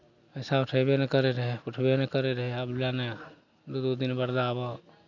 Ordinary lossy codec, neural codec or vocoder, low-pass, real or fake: none; none; 7.2 kHz; real